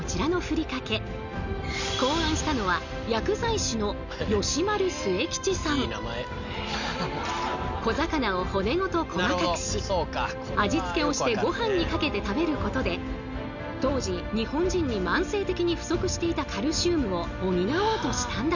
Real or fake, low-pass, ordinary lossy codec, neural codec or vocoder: real; 7.2 kHz; none; none